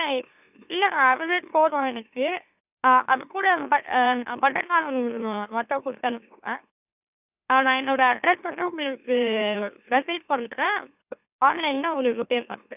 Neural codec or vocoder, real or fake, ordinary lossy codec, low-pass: autoencoder, 44.1 kHz, a latent of 192 numbers a frame, MeloTTS; fake; none; 3.6 kHz